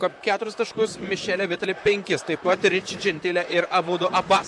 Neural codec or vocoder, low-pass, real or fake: vocoder, 44.1 kHz, 128 mel bands, Pupu-Vocoder; 10.8 kHz; fake